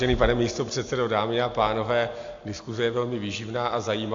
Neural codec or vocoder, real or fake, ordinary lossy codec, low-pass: none; real; AAC, 48 kbps; 7.2 kHz